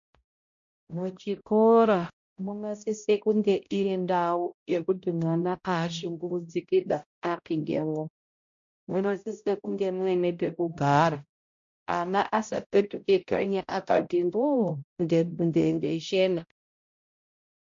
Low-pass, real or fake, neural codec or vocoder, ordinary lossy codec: 7.2 kHz; fake; codec, 16 kHz, 0.5 kbps, X-Codec, HuBERT features, trained on balanced general audio; MP3, 48 kbps